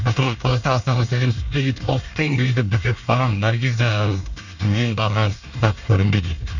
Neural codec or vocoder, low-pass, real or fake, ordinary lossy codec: codec, 24 kHz, 1 kbps, SNAC; 7.2 kHz; fake; none